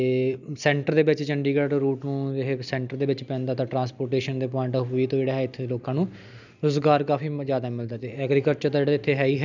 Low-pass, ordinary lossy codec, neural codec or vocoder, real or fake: 7.2 kHz; none; none; real